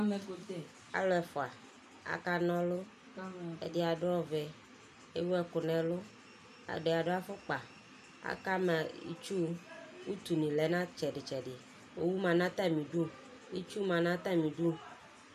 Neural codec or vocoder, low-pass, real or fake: none; 14.4 kHz; real